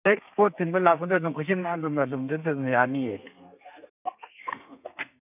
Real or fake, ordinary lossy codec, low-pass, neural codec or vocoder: fake; none; 3.6 kHz; codec, 32 kHz, 1.9 kbps, SNAC